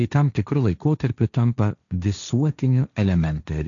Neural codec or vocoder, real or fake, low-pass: codec, 16 kHz, 1.1 kbps, Voila-Tokenizer; fake; 7.2 kHz